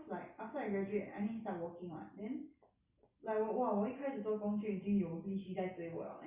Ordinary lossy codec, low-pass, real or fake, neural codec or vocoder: Opus, 64 kbps; 3.6 kHz; real; none